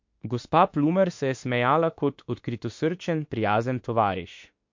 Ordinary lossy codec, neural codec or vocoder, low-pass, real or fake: MP3, 48 kbps; autoencoder, 48 kHz, 32 numbers a frame, DAC-VAE, trained on Japanese speech; 7.2 kHz; fake